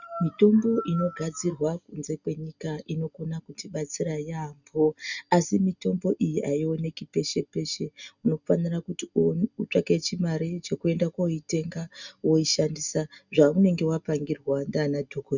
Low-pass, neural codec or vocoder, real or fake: 7.2 kHz; none; real